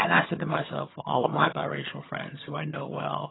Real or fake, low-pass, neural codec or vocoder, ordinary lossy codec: fake; 7.2 kHz; vocoder, 22.05 kHz, 80 mel bands, HiFi-GAN; AAC, 16 kbps